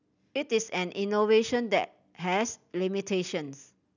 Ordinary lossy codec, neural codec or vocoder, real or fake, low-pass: none; none; real; 7.2 kHz